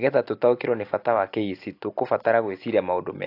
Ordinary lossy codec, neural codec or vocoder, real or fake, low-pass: AAC, 32 kbps; none; real; 5.4 kHz